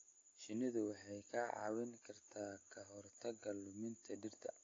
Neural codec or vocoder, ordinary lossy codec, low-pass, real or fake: none; AAC, 32 kbps; 7.2 kHz; real